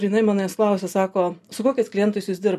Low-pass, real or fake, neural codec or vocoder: 14.4 kHz; real; none